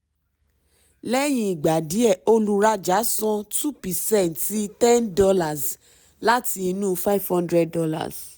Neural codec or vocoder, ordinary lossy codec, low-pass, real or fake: none; none; none; real